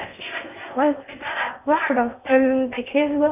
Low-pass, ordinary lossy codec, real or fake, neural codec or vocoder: 3.6 kHz; none; fake; codec, 16 kHz in and 24 kHz out, 0.6 kbps, FocalCodec, streaming, 4096 codes